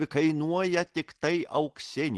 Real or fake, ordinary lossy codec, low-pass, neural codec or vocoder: real; Opus, 16 kbps; 10.8 kHz; none